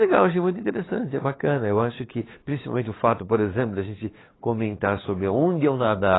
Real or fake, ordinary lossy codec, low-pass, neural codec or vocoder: fake; AAC, 16 kbps; 7.2 kHz; codec, 16 kHz, 8 kbps, FunCodec, trained on LibriTTS, 25 frames a second